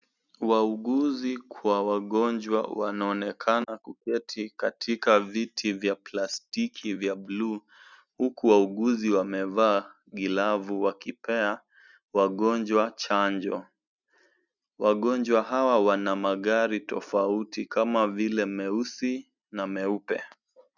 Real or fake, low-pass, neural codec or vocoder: real; 7.2 kHz; none